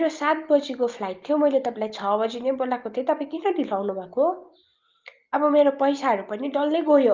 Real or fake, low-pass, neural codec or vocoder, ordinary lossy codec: real; 7.2 kHz; none; Opus, 32 kbps